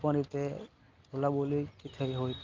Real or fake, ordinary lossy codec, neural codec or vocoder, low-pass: real; Opus, 32 kbps; none; 7.2 kHz